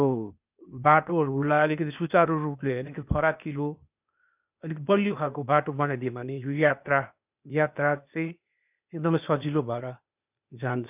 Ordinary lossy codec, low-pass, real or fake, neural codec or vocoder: none; 3.6 kHz; fake; codec, 16 kHz, 0.8 kbps, ZipCodec